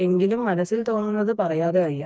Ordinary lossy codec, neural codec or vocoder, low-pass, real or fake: none; codec, 16 kHz, 2 kbps, FreqCodec, smaller model; none; fake